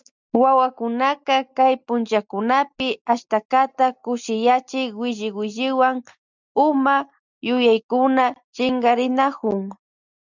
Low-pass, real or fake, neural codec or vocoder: 7.2 kHz; real; none